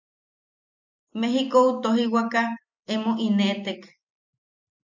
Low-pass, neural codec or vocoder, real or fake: 7.2 kHz; none; real